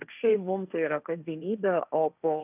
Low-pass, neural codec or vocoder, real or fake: 3.6 kHz; codec, 16 kHz, 1.1 kbps, Voila-Tokenizer; fake